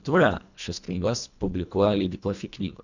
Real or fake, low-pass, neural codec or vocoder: fake; 7.2 kHz; codec, 24 kHz, 1.5 kbps, HILCodec